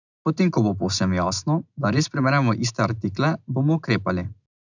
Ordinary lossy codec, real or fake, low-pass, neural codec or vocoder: none; real; 7.2 kHz; none